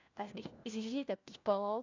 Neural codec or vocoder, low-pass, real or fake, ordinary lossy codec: codec, 16 kHz, 1 kbps, FunCodec, trained on LibriTTS, 50 frames a second; 7.2 kHz; fake; AAC, 48 kbps